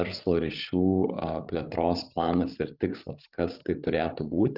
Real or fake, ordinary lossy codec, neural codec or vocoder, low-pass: fake; Opus, 24 kbps; codec, 16 kHz, 16 kbps, FreqCodec, larger model; 5.4 kHz